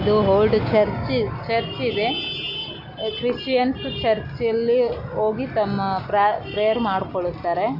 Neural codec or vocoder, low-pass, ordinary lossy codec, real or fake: none; 5.4 kHz; none; real